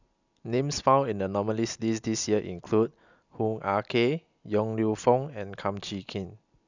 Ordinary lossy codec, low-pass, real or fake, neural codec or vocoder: none; 7.2 kHz; real; none